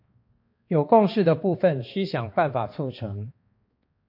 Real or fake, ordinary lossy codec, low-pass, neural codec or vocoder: fake; MP3, 32 kbps; 5.4 kHz; codec, 16 kHz, 2 kbps, X-Codec, HuBERT features, trained on balanced general audio